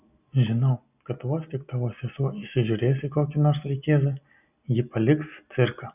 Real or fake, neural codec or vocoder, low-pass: real; none; 3.6 kHz